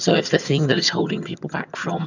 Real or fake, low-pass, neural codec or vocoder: fake; 7.2 kHz; vocoder, 22.05 kHz, 80 mel bands, HiFi-GAN